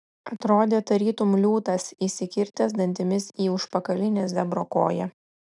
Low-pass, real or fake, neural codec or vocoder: 10.8 kHz; real; none